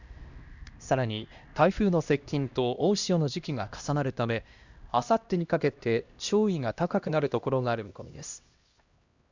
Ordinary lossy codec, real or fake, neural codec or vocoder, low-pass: Opus, 64 kbps; fake; codec, 16 kHz, 1 kbps, X-Codec, HuBERT features, trained on LibriSpeech; 7.2 kHz